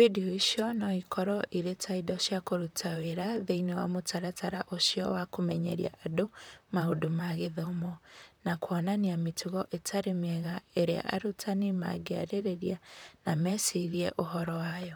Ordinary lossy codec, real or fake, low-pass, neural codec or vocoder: none; fake; none; vocoder, 44.1 kHz, 128 mel bands, Pupu-Vocoder